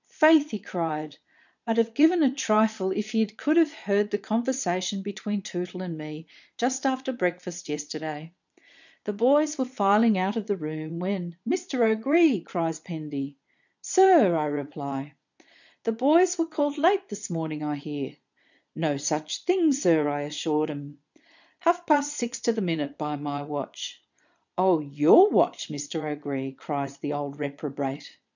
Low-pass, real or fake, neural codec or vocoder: 7.2 kHz; fake; vocoder, 22.05 kHz, 80 mel bands, Vocos